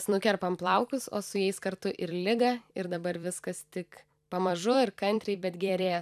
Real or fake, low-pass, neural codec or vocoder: fake; 14.4 kHz; vocoder, 44.1 kHz, 128 mel bands every 256 samples, BigVGAN v2